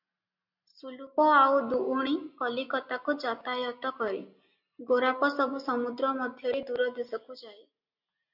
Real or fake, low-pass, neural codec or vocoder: real; 5.4 kHz; none